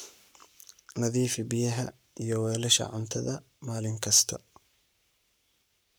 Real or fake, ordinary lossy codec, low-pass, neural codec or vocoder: fake; none; none; codec, 44.1 kHz, 7.8 kbps, Pupu-Codec